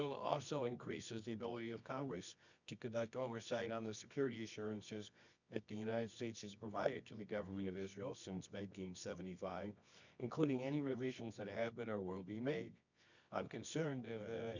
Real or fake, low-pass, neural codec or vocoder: fake; 7.2 kHz; codec, 24 kHz, 0.9 kbps, WavTokenizer, medium music audio release